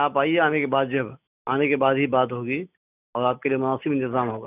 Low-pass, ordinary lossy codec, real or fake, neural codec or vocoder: 3.6 kHz; none; real; none